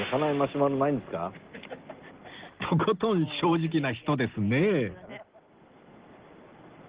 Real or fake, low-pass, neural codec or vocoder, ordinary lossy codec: real; 3.6 kHz; none; Opus, 16 kbps